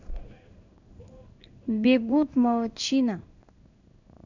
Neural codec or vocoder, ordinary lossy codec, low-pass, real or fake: codec, 16 kHz in and 24 kHz out, 1 kbps, XY-Tokenizer; none; 7.2 kHz; fake